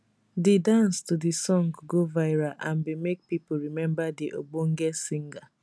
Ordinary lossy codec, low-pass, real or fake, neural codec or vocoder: none; none; real; none